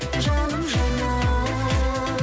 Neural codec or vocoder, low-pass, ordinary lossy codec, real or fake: none; none; none; real